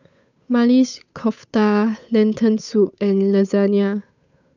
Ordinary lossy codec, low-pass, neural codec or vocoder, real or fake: none; 7.2 kHz; codec, 16 kHz, 8 kbps, FunCodec, trained on Chinese and English, 25 frames a second; fake